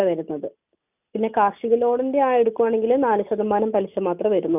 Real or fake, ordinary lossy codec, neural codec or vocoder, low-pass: real; none; none; 3.6 kHz